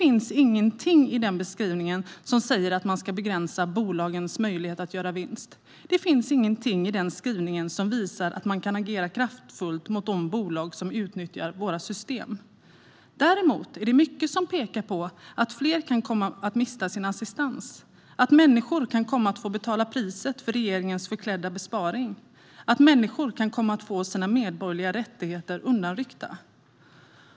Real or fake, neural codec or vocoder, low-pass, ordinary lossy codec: real; none; none; none